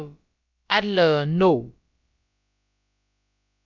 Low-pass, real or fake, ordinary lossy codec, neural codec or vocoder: 7.2 kHz; fake; MP3, 64 kbps; codec, 16 kHz, about 1 kbps, DyCAST, with the encoder's durations